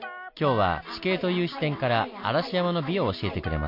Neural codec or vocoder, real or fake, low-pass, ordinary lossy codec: none; real; 5.4 kHz; MP3, 32 kbps